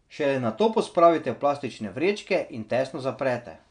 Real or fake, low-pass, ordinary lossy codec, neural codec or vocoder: real; 9.9 kHz; none; none